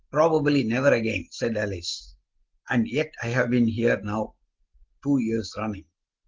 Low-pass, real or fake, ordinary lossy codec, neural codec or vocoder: 7.2 kHz; real; Opus, 16 kbps; none